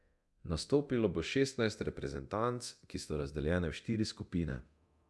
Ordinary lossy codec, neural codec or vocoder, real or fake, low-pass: none; codec, 24 kHz, 0.9 kbps, DualCodec; fake; none